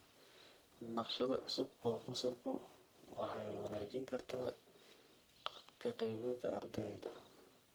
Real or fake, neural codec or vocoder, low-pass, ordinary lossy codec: fake; codec, 44.1 kHz, 1.7 kbps, Pupu-Codec; none; none